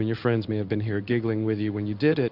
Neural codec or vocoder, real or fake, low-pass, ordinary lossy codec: codec, 16 kHz in and 24 kHz out, 1 kbps, XY-Tokenizer; fake; 5.4 kHz; Opus, 64 kbps